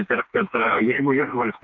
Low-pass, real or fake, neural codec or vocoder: 7.2 kHz; fake; codec, 16 kHz, 2 kbps, FreqCodec, smaller model